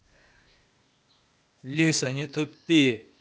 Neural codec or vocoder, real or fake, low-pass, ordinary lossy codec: codec, 16 kHz, 0.8 kbps, ZipCodec; fake; none; none